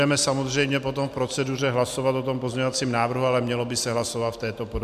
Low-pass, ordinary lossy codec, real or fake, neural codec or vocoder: 14.4 kHz; MP3, 96 kbps; real; none